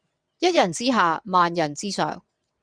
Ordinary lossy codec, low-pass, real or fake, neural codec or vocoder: Opus, 64 kbps; 9.9 kHz; fake; vocoder, 22.05 kHz, 80 mel bands, WaveNeXt